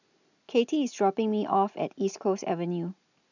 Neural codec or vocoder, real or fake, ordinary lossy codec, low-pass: none; real; none; 7.2 kHz